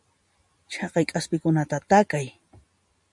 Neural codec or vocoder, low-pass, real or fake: none; 10.8 kHz; real